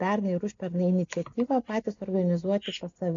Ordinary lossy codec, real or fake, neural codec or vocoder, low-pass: MP3, 48 kbps; real; none; 7.2 kHz